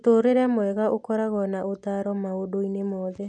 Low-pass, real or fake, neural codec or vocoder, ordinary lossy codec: none; real; none; none